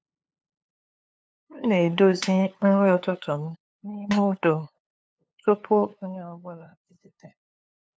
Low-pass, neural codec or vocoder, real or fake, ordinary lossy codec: none; codec, 16 kHz, 2 kbps, FunCodec, trained on LibriTTS, 25 frames a second; fake; none